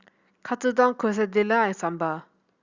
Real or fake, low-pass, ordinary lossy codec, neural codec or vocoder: real; 7.2 kHz; Opus, 64 kbps; none